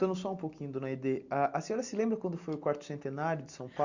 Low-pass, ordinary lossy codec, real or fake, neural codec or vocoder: 7.2 kHz; Opus, 64 kbps; real; none